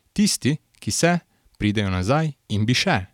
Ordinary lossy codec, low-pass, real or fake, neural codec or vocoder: none; 19.8 kHz; real; none